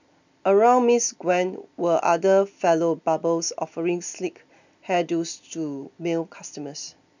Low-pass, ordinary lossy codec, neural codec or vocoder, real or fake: 7.2 kHz; none; none; real